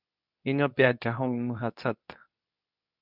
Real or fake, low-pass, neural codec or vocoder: fake; 5.4 kHz; codec, 24 kHz, 0.9 kbps, WavTokenizer, medium speech release version 2